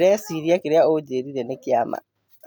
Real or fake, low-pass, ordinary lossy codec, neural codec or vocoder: real; none; none; none